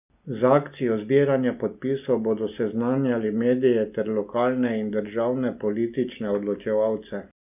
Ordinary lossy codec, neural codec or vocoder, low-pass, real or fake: none; none; 3.6 kHz; real